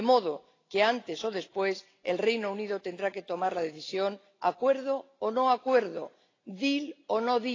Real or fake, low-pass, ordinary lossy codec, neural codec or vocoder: real; 7.2 kHz; AAC, 32 kbps; none